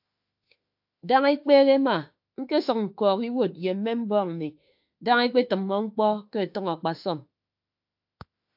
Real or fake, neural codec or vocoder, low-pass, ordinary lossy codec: fake; autoencoder, 48 kHz, 32 numbers a frame, DAC-VAE, trained on Japanese speech; 5.4 kHz; AAC, 48 kbps